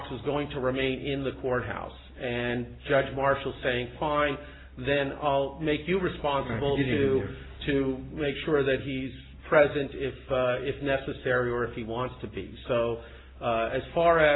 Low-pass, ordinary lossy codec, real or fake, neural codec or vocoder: 7.2 kHz; AAC, 16 kbps; real; none